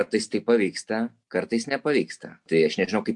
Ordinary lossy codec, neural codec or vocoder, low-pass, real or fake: MP3, 64 kbps; none; 10.8 kHz; real